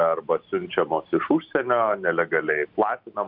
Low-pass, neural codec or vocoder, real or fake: 5.4 kHz; none; real